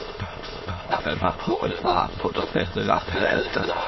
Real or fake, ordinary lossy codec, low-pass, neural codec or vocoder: fake; MP3, 24 kbps; 7.2 kHz; autoencoder, 22.05 kHz, a latent of 192 numbers a frame, VITS, trained on many speakers